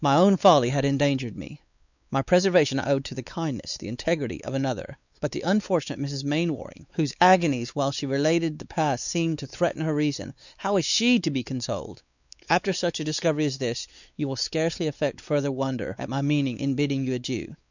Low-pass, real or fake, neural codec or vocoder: 7.2 kHz; fake; codec, 16 kHz, 4 kbps, X-Codec, WavLM features, trained on Multilingual LibriSpeech